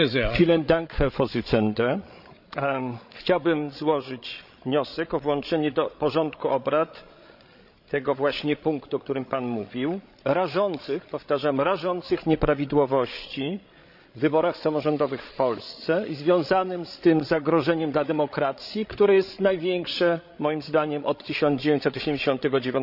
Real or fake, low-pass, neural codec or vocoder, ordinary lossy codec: fake; 5.4 kHz; codec, 16 kHz, 16 kbps, FreqCodec, larger model; none